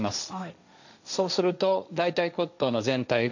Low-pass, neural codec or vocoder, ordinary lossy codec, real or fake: 7.2 kHz; codec, 16 kHz, 1.1 kbps, Voila-Tokenizer; none; fake